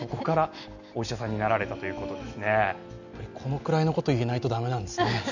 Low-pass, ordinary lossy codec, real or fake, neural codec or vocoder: 7.2 kHz; none; real; none